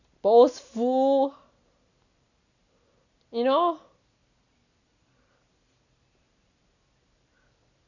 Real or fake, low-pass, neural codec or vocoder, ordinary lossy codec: real; 7.2 kHz; none; none